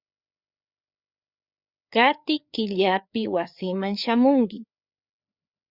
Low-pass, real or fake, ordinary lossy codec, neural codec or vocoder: 5.4 kHz; fake; Opus, 64 kbps; codec, 16 kHz, 4 kbps, FreqCodec, larger model